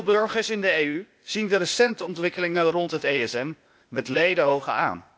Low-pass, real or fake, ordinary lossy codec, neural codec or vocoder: none; fake; none; codec, 16 kHz, 0.8 kbps, ZipCodec